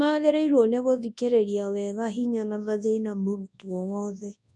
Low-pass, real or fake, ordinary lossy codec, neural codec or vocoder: 10.8 kHz; fake; none; codec, 24 kHz, 0.9 kbps, WavTokenizer, large speech release